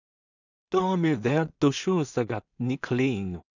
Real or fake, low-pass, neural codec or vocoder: fake; 7.2 kHz; codec, 16 kHz in and 24 kHz out, 0.4 kbps, LongCat-Audio-Codec, two codebook decoder